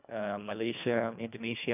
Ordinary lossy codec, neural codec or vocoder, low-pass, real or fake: none; codec, 24 kHz, 1.5 kbps, HILCodec; 3.6 kHz; fake